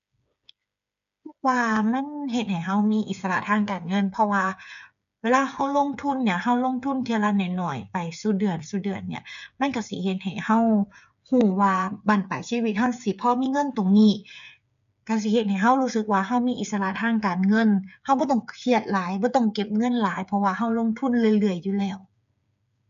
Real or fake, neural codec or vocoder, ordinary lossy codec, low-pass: fake; codec, 16 kHz, 8 kbps, FreqCodec, smaller model; none; 7.2 kHz